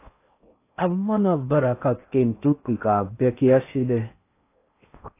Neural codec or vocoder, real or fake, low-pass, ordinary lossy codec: codec, 16 kHz in and 24 kHz out, 0.6 kbps, FocalCodec, streaming, 4096 codes; fake; 3.6 kHz; AAC, 24 kbps